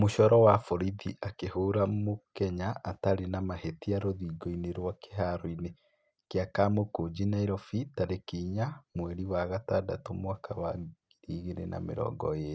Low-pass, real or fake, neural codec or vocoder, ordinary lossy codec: none; real; none; none